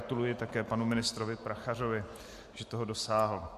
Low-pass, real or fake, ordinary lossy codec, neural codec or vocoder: 14.4 kHz; real; MP3, 64 kbps; none